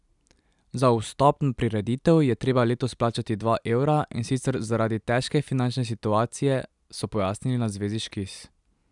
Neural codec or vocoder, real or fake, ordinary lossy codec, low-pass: none; real; none; 10.8 kHz